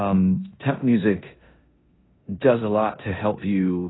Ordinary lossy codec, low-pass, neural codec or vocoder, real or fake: AAC, 16 kbps; 7.2 kHz; codec, 16 kHz in and 24 kHz out, 0.9 kbps, LongCat-Audio-Codec, four codebook decoder; fake